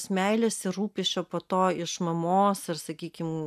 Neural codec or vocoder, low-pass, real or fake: none; 14.4 kHz; real